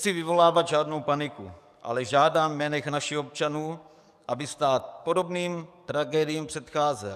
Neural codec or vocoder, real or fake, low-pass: codec, 44.1 kHz, 7.8 kbps, DAC; fake; 14.4 kHz